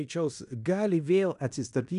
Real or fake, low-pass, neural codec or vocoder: fake; 10.8 kHz; codec, 16 kHz in and 24 kHz out, 0.9 kbps, LongCat-Audio-Codec, fine tuned four codebook decoder